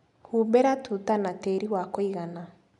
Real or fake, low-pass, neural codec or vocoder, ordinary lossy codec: real; 10.8 kHz; none; none